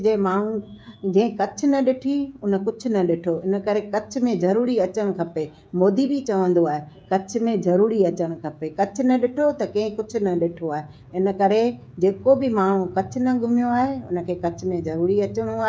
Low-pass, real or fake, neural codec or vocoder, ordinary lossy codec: none; fake; codec, 16 kHz, 16 kbps, FreqCodec, smaller model; none